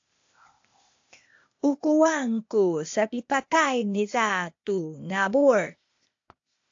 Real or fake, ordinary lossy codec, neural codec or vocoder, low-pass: fake; AAC, 48 kbps; codec, 16 kHz, 0.8 kbps, ZipCodec; 7.2 kHz